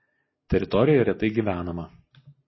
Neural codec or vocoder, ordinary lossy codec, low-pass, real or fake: none; MP3, 24 kbps; 7.2 kHz; real